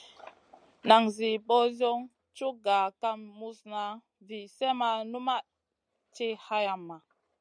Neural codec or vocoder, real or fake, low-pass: none; real; 9.9 kHz